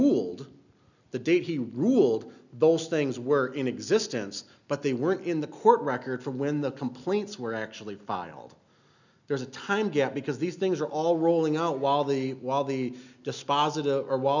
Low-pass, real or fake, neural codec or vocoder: 7.2 kHz; real; none